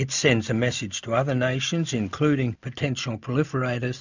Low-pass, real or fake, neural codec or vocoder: 7.2 kHz; real; none